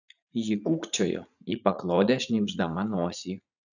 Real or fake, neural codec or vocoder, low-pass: fake; vocoder, 44.1 kHz, 80 mel bands, Vocos; 7.2 kHz